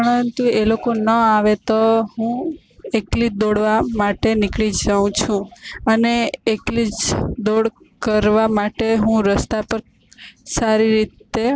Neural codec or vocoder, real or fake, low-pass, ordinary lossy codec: none; real; none; none